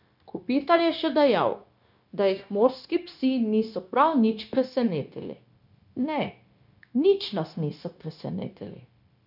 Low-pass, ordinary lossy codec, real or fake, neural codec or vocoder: 5.4 kHz; none; fake; codec, 16 kHz, 0.9 kbps, LongCat-Audio-Codec